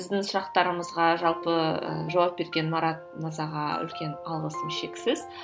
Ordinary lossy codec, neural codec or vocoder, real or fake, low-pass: none; none; real; none